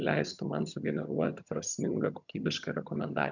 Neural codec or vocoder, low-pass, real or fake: vocoder, 22.05 kHz, 80 mel bands, HiFi-GAN; 7.2 kHz; fake